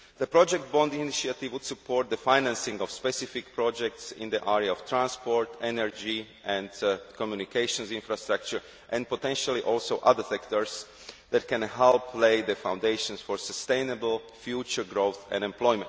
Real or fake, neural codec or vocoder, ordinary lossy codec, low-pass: real; none; none; none